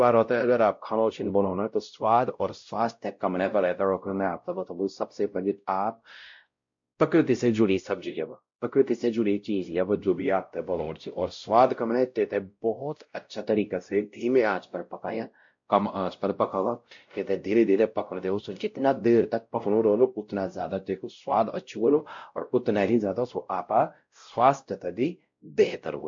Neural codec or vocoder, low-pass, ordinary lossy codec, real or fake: codec, 16 kHz, 0.5 kbps, X-Codec, WavLM features, trained on Multilingual LibriSpeech; 7.2 kHz; MP3, 48 kbps; fake